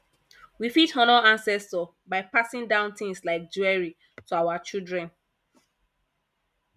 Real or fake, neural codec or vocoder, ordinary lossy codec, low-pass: real; none; none; 14.4 kHz